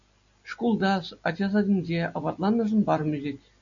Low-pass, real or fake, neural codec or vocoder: 7.2 kHz; real; none